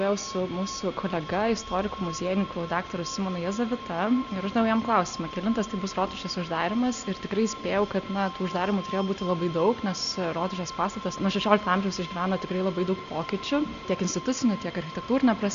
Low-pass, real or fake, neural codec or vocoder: 7.2 kHz; real; none